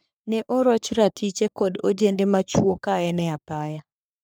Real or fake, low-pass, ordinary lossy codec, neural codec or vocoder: fake; none; none; codec, 44.1 kHz, 3.4 kbps, Pupu-Codec